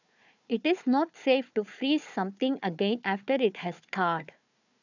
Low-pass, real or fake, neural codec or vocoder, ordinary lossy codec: 7.2 kHz; fake; codec, 16 kHz, 4 kbps, FunCodec, trained on Chinese and English, 50 frames a second; none